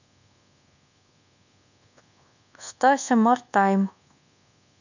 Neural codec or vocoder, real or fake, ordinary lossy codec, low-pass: codec, 24 kHz, 1.2 kbps, DualCodec; fake; none; 7.2 kHz